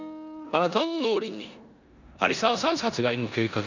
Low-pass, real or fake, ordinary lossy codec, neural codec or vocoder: 7.2 kHz; fake; none; codec, 16 kHz in and 24 kHz out, 0.9 kbps, LongCat-Audio-Codec, four codebook decoder